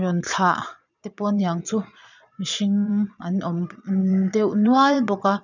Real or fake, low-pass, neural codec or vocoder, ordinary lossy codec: fake; 7.2 kHz; vocoder, 22.05 kHz, 80 mel bands, Vocos; none